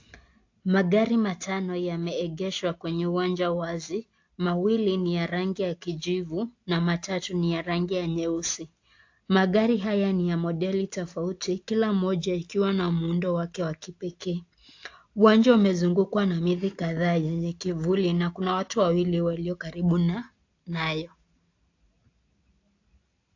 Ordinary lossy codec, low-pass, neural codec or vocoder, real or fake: AAC, 48 kbps; 7.2 kHz; none; real